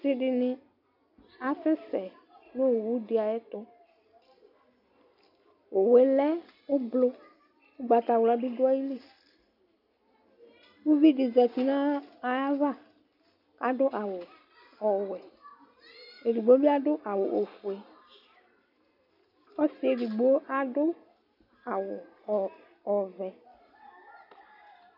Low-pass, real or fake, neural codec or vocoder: 5.4 kHz; real; none